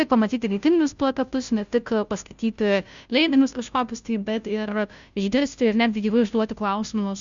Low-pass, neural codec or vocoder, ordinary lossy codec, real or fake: 7.2 kHz; codec, 16 kHz, 0.5 kbps, FunCodec, trained on Chinese and English, 25 frames a second; Opus, 64 kbps; fake